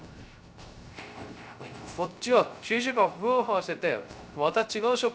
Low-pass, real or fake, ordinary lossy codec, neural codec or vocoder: none; fake; none; codec, 16 kHz, 0.3 kbps, FocalCodec